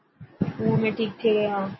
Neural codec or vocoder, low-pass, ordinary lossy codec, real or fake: none; 7.2 kHz; MP3, 24 kbps; real